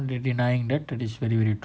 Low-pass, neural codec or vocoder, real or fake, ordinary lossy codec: none; none; real; none